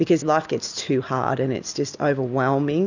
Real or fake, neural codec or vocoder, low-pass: real; none; 7.2 kHz